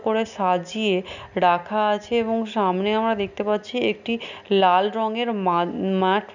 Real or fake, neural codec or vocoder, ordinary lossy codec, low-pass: real; none; none; 7.2 kHz